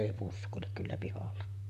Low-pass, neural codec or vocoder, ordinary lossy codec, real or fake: 14.4 kHz; vocoder, 44.1 kHz, 128 mel bands, Pupu-Vocoder; none; fake